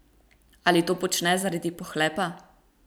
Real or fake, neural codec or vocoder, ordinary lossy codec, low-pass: fake; vocoder, 44.1 kHz, 128 mel bands every 256 samples, BigVGAN v2; none; none